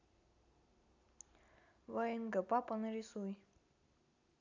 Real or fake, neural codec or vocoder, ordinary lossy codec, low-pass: real; none; none; 7.2 kHz